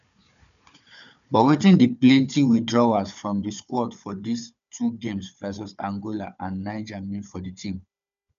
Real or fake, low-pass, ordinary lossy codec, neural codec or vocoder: fake; 7.2 kHz; none; codec, 16 kHz, 4 kbps, FunCodec, trained on Chinese and English, 50 frames a second